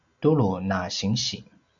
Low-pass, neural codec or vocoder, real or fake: 7.2 kHz; none; real